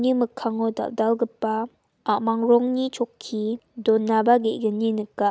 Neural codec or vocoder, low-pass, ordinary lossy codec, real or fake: none; none; none; real